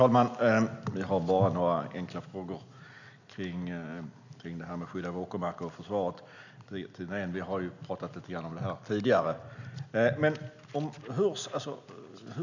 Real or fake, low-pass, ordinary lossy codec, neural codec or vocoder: real; 7.2 kHz; none; none